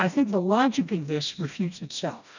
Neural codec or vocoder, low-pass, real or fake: codec, 16 kHz, 1 kbps, FreqCodec, smaller model; 7.2 kHz; fake